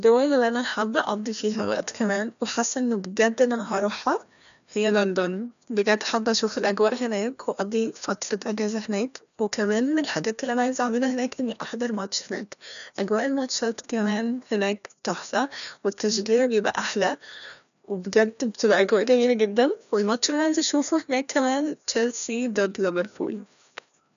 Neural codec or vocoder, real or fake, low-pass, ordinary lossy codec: codec, 16 kHz, 1 kbps, FreqCodec, larger model; fake; 7.2 kHz; none